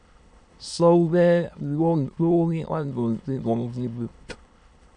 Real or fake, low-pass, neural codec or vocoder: fake; 9.9 kHz; autoencoder, 22.05 kHz, a latent of 192 numbers a frame, VITS, trained on many speakers